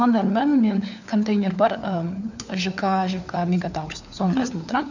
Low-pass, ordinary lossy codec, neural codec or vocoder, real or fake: 7.2 kHz; none; codec, 16 kHz, 4 kbps, FunCodec, trained on LibriTTS, 50 frames a second; fake